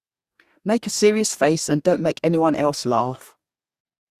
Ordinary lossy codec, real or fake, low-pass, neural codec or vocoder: Opus, 64 kbps; fake; 14.4 kHz; codec, 44.1 kHz, 2.6 kbps, DAC